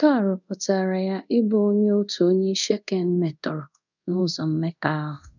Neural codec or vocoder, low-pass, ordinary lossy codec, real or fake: codec, 24 kHz, 0.5 kbps, DualCodec; 7.2 kHz; none; fake